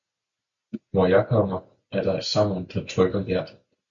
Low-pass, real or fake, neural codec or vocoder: 7.2 kHz; real; none